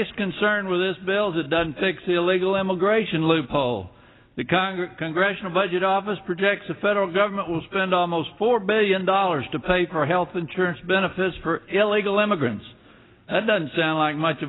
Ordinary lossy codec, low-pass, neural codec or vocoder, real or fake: AAC, 16 kbps; 7.2 kHz; none; real